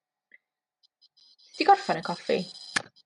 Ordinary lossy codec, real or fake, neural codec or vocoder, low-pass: MP3, 64 kbps; real; none; 10.8 kHz